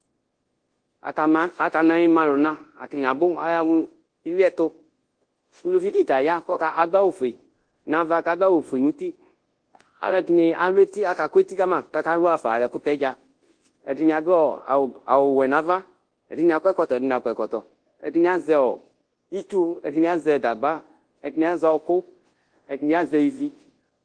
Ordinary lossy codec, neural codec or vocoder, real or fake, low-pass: Opus, 16 kbps; codec, 24 kHz, 0.9 kbps, WavTokenizer, large speech release; fake; 9.9 kHz